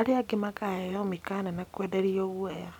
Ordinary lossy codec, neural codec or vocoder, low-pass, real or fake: none; none; 19.8 kHz; real